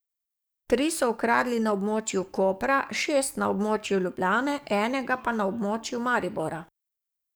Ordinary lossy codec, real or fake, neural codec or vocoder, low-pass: none; fake; codec, 44.1 kHz, 7.8 kbps, DAC; none